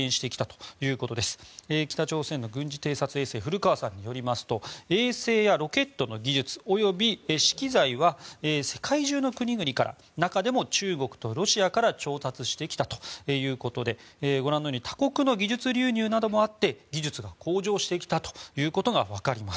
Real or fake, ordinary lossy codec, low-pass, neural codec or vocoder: real; none; none; none